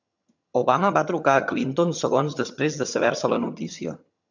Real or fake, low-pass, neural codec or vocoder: fake; 7.2 kHz; vocoder, 22.05 kHz, 80 mel bands, HiFi-GAN